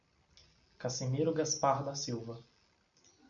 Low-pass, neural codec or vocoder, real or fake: 7.2 kHz; none; real